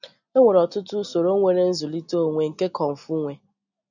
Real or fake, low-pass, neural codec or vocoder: real; 7.2 kHz; none